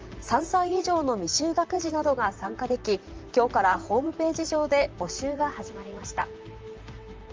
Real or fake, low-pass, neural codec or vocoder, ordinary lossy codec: fake; 7.2 kHz; vocoder, 44.1 kHz, 128 mel bands, Pupu-Vocoder; Opus, 24 kbps